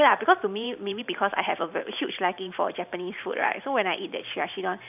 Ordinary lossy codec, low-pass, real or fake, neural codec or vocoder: none; 3.6 kHz; fake; vocoder, 44.1 kHz, 128 mel bands every 512 samples, BigVGAN v2